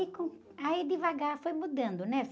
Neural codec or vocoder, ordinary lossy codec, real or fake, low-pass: none; none; real; none